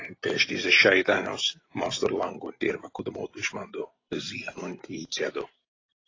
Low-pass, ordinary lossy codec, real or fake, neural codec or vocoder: 7.2 kHz; AAC, 32 kbps; real; none